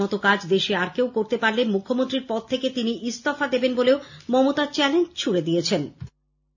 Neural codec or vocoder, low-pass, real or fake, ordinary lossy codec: none; 7.2 kHz; real; none